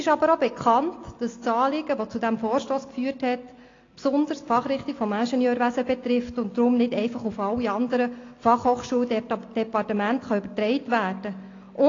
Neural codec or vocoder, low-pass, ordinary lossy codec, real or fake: none; 7.2 kHz; AAC, 32 kbps; real